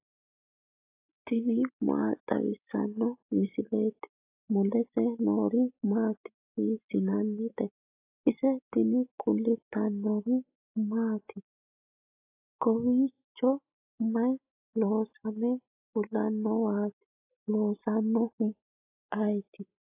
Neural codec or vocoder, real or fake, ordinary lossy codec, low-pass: none; real; AAC, 32 kbps; 3.6 kHz